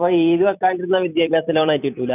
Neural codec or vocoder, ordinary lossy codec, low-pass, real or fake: vocoder, 44.1 kHz, 128 mel bands every 256 samples, BigVGAN v2; none; 3.6 kHz; fake